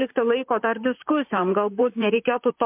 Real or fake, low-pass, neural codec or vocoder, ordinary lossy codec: fake; 3.6 kHz; vocoder, 44.1 kHz, 128 mel bands, Pupu-Vocoder; MP3, 32 kbps